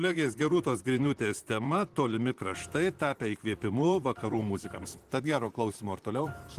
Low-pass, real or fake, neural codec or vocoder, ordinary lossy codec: 14.4 kHz; fake; vocoder, 44.1 kHz, 128 mel bands, Pupu-Vocoder; Opus, 24 kbps